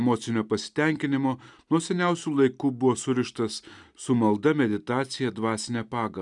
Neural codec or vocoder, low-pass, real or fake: none; 10.8 kHz; real